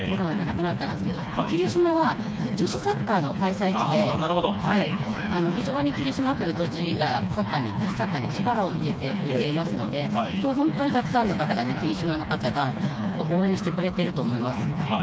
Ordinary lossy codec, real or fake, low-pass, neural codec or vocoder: none; fake; none; codec, 16 kHz, 2 kbps, FreqCodec, smaller model